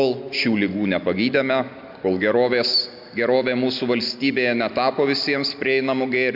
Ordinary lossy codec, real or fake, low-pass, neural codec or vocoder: MP3, 48 kbps; real; 5.4 kHz; none